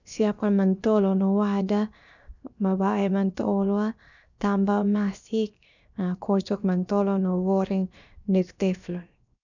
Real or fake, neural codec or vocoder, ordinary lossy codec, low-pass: fake; codec, 16 kHz, 0.7 kbps, FocalCodec; none; 7.2 kHz